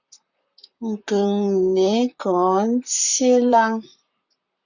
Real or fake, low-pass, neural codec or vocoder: fake; 7.2 kHz; vocoder, 44.1 kHz, 128 mel bands, Pupu-Vocoder